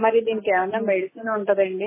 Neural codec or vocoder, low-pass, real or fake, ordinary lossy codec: none; 3.6 kHz; real; MP3, 16 kbps